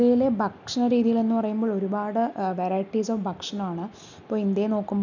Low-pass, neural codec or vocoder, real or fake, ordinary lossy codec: 7.2 kHz; none; real; none